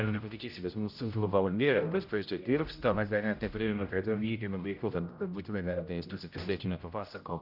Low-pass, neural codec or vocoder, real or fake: 5.4 kHz; codec, 16 kHz, 0.5 kbps, X-Codec, HuBERT features, trained on general audio; fake